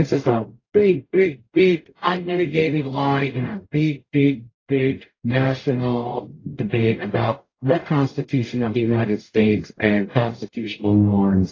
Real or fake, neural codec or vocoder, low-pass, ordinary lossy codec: fake; codec, 44.1 kHz, 0.9 kbps, DAC; 7.2 kHz; AAC, 32 kbps